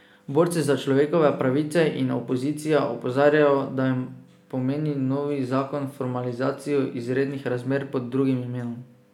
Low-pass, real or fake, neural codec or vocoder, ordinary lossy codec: 19.8 kHz; real; none; none